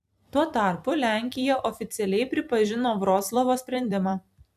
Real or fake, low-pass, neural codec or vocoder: fake; 14.4 kHz; vocoder, 44.1 kHz, 128 mel bands every 256 samples, BigVGAN v2